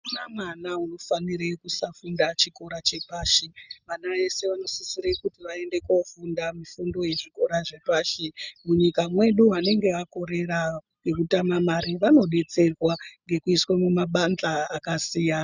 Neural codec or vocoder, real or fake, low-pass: none; real; 7.2 kHz